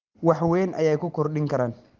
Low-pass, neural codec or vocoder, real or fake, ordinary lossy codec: 7.2 kHz; none; real; Opus, 16 kbps